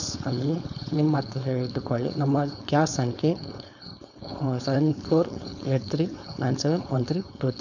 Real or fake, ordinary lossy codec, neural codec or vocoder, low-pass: fake; none; codec, 16 kHz, 4.8 kbps, FACodec; 7.2 kHz